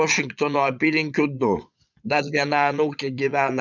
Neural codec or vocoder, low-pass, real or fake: vocoder, 22.05 kHz, 80 mel bands, Vocos; 7.2 kHz; fake